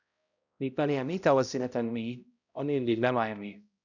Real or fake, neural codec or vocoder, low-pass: fake; codec, 16 kHz, 0.5 kbps, X-Codec, HuBERT features, trained on balanced general audio; 7.2 kHz